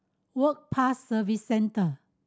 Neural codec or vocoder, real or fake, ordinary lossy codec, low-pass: none; real; none; none